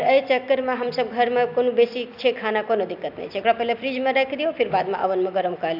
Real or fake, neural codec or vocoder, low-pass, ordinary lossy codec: real; none; 5.4 kHz; AAC, 48 kbps